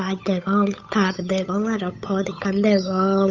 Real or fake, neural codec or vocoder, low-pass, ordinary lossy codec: fake; codec, 16 kHz, 8 kbps, FunCodec, trained on Chinese and English, 25 frames a second; 7.2 kHz; none